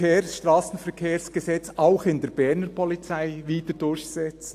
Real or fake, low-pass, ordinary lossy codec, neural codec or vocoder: real; 14.4 kHz; AAC, 64 kbps; none